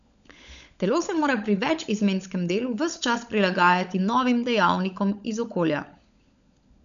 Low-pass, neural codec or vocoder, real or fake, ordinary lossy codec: 7.2 kHz; codec, 16 kHz, 16 kbps, FunCodec, trained on LibriTTS, 50 frames a second; fake; none